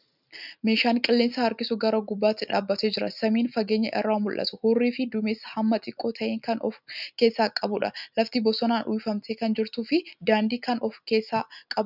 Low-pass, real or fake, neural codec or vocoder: 5.4 kHz; real; none